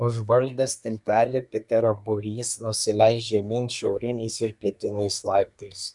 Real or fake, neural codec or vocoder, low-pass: fake; codec, 24 kHz, 1 kbps, SNAC; 10.8 kHz